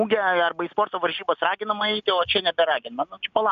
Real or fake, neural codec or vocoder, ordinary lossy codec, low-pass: real; none; MP3, 96 kbps; 7.2 kHz